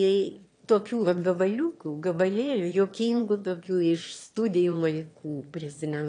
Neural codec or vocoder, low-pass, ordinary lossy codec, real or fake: autoencoder, 22.05 kHz, a latent of 192 numbers a frame, VITS, trained on one speaker; 9.9 kHz; AAC, 48 kbps; fake